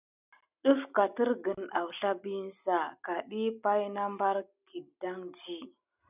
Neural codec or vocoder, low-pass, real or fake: none; 3.6 kHz; real